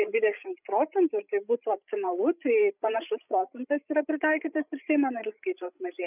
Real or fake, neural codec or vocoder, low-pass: fake; codec, 16 kHz, 16 kbps, FreqCodec, larger model; 3.6 kHz